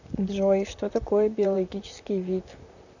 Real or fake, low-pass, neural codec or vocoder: fake; 7.2 kHz; vocoder, 44.1 kHz, 128 mel bands, Pupu-Vocoder